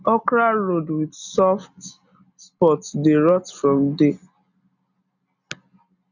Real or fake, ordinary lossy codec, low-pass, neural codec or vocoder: real; none; 7.2 kHz; none